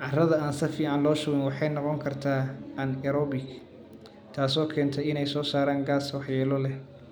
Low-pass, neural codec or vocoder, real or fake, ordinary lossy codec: none; none; real; none